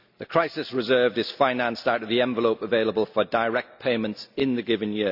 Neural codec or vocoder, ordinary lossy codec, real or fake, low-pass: none; none; real; 5.4 kHz